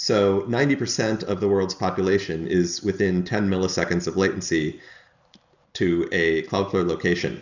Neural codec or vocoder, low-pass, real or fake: none; 7.2 kHz; real